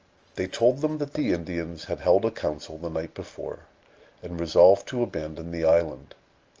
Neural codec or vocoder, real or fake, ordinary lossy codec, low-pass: none; real; Opus, 24 kbps; 7.2 kHz